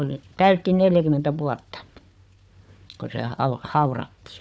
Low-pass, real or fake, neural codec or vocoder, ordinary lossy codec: none; fake; codec, 16 kHz, 4 kbps, FunCodec, trained on Chinese and English, 50 frames a second; none